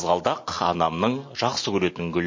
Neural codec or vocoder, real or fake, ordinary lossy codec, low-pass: none; real; MP3, 32 kbps; 7.2 kHz